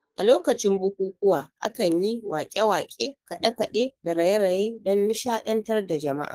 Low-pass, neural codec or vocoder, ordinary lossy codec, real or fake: 14.4 kHz; codec, 32 kHz, 1.9 kbps, SNAC; Opus, 24 kbps; fake